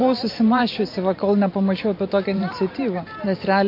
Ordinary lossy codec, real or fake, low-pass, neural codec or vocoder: MP3, 32 kbps; fake; 5.4 kHz; vocoder, 44.1 kHz, 128 mel bands every 512 samples, BigVGAN v2